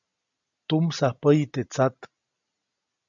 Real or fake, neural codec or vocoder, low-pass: real; none; 7.2 kHz